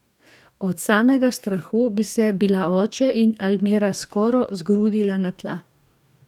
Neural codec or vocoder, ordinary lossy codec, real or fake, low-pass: codec, 44.1 kHz, 2.6 kbps, DAC; none; fake; 19.8 kHz